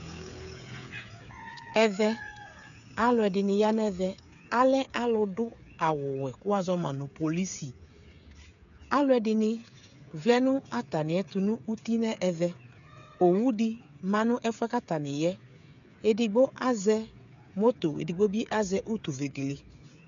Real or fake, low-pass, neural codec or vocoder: fake; 7.2 kHz; codec, 16 kHz, 8 kbps, FreqCodec, smaller model